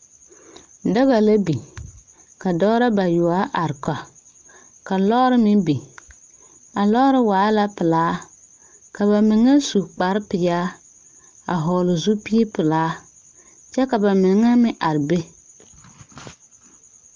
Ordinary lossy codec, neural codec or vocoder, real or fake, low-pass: Opus, 24 kbps; none; real; 7.2 kHz